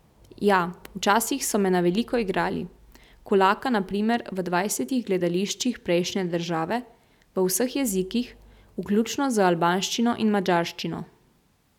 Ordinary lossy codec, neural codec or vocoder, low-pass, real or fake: none; none; 19.8 kHz; real